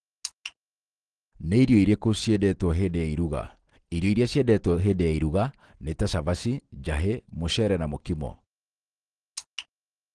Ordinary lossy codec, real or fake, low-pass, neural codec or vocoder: Opus, 16 kbps; real; 9.9 kHz; none